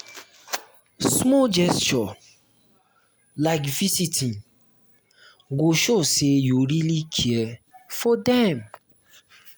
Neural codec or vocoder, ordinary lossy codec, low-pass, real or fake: none; none; none; real